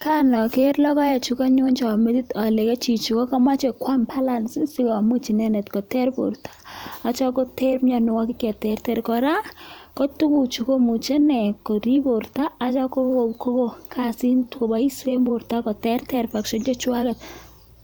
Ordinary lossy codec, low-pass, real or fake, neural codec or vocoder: none; none; fake; vocoder, 44.1 kHz, 128 mel bands every 512 samples, BigVGAN v2